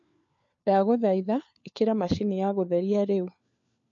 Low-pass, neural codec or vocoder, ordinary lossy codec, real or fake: 7.2 kHz; codec, 16 kHz, 4 kbps, FunCodec, trained on LibriTTS, 50 frames a second; MP3, 48 kbps; fake